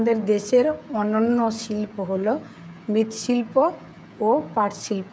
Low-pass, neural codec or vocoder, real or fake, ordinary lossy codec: none; codec, 16 kHz, 16 kbps, FreqCodec, smaller model; fake; none